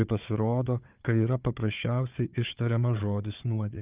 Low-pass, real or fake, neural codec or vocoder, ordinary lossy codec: 3.6 kHz; fake; codec, 16 kHz, 4 kbps, FunCodec, trained on Chinese and English, 50 frames a second; Opus, 24 kbps